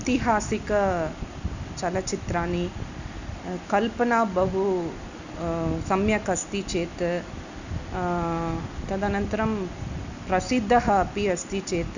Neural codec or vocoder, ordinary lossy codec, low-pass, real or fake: none; none; 7.2 kHz; real